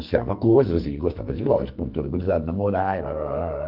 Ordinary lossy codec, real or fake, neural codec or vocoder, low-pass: Opus, 32 kbps; fake; codec, 44.1 kHz, 2.6 kbps, SNAC; 5.4 kHz